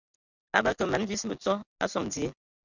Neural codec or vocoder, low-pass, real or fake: none; 7.2 kHz; real